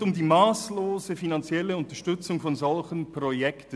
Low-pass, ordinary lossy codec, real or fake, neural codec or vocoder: none; none; real; none